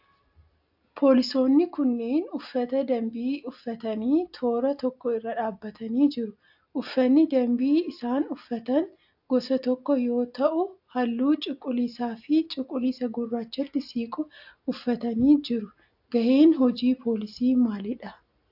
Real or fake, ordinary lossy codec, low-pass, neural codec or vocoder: real; AAC, 48 kbps; 5.4 kHz; none